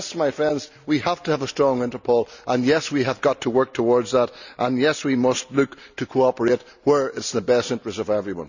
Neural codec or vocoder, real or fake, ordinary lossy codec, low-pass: none; real; none; 7.2 kHz